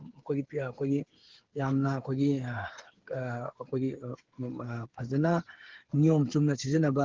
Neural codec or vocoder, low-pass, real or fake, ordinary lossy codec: codec, 16 kHz, 8 kbps, FreqCodec, smaller model; 7.2 kHz; fake; Opus, 16 kbps